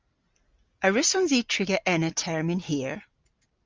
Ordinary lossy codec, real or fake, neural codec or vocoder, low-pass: Opus, 32 kbps; real; none; 7.2 kHz